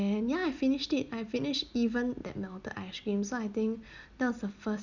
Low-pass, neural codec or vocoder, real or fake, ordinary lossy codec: 7.2 kHz; none; real; none